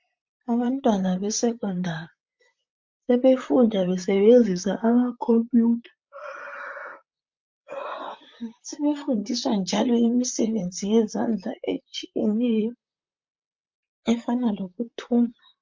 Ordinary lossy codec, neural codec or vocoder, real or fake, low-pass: MP3, 48 kbps; vocoder, 44.1 kHz, 128 mel bands, Pupu-Vocoder; fake; 7.2 kHz